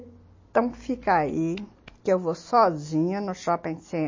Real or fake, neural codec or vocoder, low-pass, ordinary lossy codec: real; none; 7.2 kHz; MP3, 32 kbps